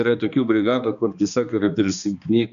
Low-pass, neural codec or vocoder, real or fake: 7.2 kHz; codec, 16 kHz, 2 kbps, X-Codec, HuBERT features, trained on balanced general audio; fake